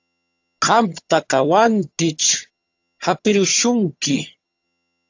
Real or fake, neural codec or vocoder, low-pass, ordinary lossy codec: fake; vocoder, 22.05 kHz, 80 mel bands, HiFi-GAN; 7.2 kHz; AAC, 48 kbps